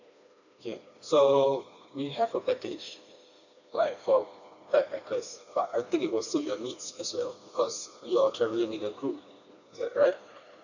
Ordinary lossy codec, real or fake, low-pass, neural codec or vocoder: none; fake; 7.2 kHz; codec, 16 kHz, 2 kbps, FreqCodec, smaller model